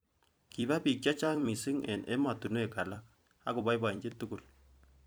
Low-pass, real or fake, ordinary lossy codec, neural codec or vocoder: none; fake; none; vocoder, 44.1 kHz, 128 mel bands every 256 samples, BigVGAN v2